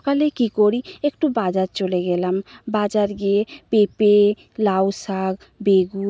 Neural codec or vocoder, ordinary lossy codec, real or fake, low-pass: none; none; real; none